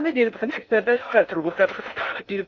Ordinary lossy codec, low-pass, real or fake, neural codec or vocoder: Opus, 64 kbps; 7.2 kHz; fake; codec, 16 kHz in and 24 kHz out, 0.6 kbps, FocalCodec, streaming, 4096 codes